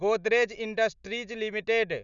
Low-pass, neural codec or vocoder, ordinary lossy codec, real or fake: 7.2 kHz; none; none; real